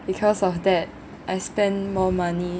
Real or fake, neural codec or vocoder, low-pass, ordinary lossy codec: real; none; none; none